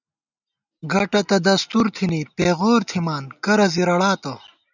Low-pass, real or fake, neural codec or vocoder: 7.2 kHz; real; none